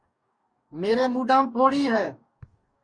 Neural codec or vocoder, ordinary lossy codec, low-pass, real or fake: codec, 44.1 kHz, 2.6 kbps, DAC; Opus, 64 kbps; 9.9 kHz; fake